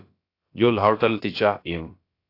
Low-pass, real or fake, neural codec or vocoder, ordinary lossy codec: 5.4 kHz; fake; codec, 16 kHz, about 1 kbps, DyCAST, with the encoder's durations; AAC, 32 kbps